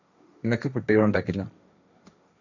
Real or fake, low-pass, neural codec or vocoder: fake; 7.2 kHz; codec, 16 kHz, 1.1 kbps, Voila-Tokenizer